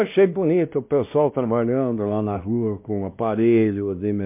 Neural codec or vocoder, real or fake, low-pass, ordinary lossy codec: codec, 16 kHz, 1 kbps, X-Codec, WavLM features, trained on Multilingual LibriSpeech; fake; 3.6 kHz; MP3, 32 kbps